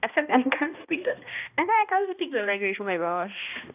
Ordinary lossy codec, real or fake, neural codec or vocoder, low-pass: none; fake; codec, 16 kHz, 1 kbps, X-Codec, HuBERT features, trained on balanced general audio; 3.6 kHz